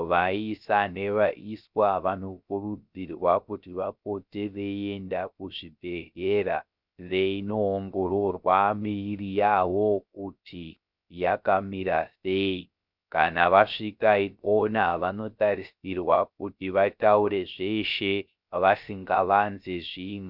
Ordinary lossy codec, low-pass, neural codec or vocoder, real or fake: Opus, 64 kbps; 5.4 kHz; codec, 16 kHz, 0.3 kbps, FocalCodec; fake